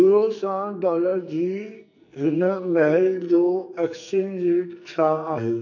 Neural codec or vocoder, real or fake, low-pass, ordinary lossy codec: codec, 44.1 kHz, 2.6 kbps, SNAC; fake; 7.2 kHz; none